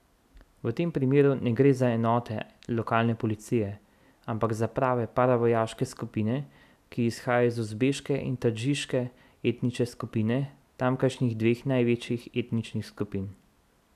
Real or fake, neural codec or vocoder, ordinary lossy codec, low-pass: real; none; none; 14.4 kHz